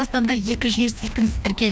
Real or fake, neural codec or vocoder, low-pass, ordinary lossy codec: fake; codec, 16 kHz, 1 kbps, FreqCodec, larger model; none; none